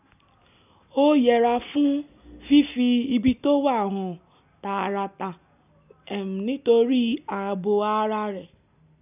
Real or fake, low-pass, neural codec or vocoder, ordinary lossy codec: real; 3.6 kHz; none; none